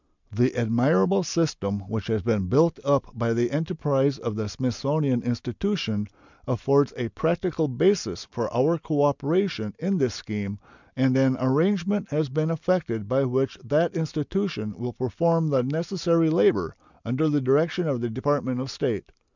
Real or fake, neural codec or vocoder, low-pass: real; none; 7.2 kHz